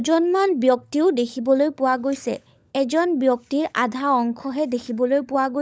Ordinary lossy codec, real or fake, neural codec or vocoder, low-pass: none; fake; codec, 16 kHz, 16 kbps, FunCodec, trained on LibriTTS, 50 frames a second; none